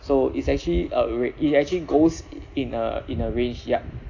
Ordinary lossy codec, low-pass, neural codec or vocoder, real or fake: none; 7.2 kHz; none; real